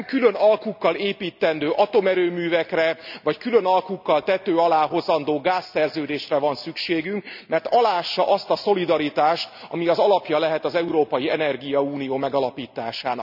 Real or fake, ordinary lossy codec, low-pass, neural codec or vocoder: real; none; 5.4 kHz; none